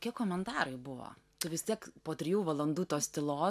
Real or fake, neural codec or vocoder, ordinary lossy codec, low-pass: real; none; MP3, 96 kbps; 14.4 kHz